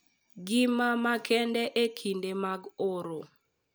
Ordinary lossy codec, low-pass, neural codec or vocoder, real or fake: none; none; none; real